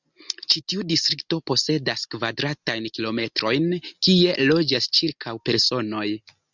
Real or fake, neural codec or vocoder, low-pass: real; none; 7.2 kHz